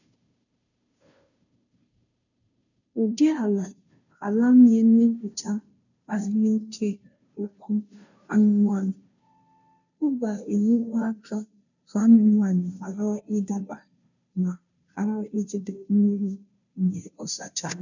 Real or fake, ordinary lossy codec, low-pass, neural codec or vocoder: fake; none; 7.2 kHz; codec, 16 kHz, 0.5 kbps, FunCodec, trained on Chinese and English, 25 frames a second